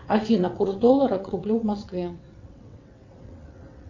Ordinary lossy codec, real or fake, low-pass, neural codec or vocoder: AAC, 48 kbps; fake; 7.2 kHz; vocoder, 22.05 kHz, 80 mel bands, WaveNeXt